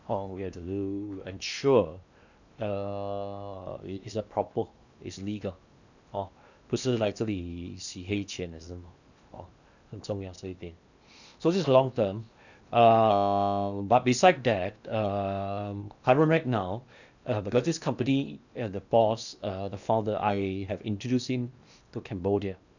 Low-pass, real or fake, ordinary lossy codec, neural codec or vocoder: 7.2 kHz; fake; none; codec, 16 kHz in and 24 kHz out, 0.8 kbps, FocalCodec, streaming, 65536 codes